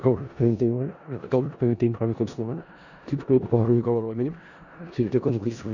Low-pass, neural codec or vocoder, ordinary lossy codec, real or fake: 7.2 kHz; codec, 16 kHz in and 24 kHz out, 0.4 kbps, LongCat-Audio-Codec, four codebook decoder; none; fake